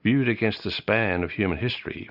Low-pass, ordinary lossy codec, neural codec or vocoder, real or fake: 5.4 kHz; Opus, 64 kbps; none; real